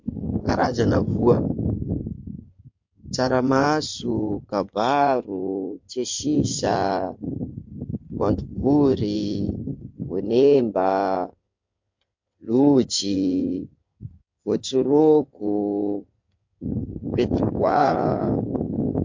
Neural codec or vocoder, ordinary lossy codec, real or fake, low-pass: codec, 16 kHz in and 24 kHz out, 2.2 kbps, FireRedTTS-2 codec; MP3, 48 kbps; fake; 7.2 kHz